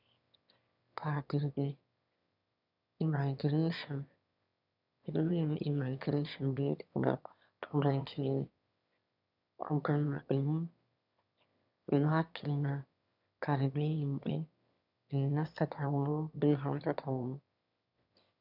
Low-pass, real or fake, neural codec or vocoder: 5.4 kHz; fake; autoencoder, 22.05 kHz, a latent of 192 numbers a frame, VITS, trained on one speaker